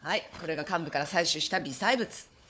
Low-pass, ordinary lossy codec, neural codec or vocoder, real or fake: none; none; codec, 16 kHz, 4 kbps, FunCodec, trained on Chinese and English, 50 frames a second; fake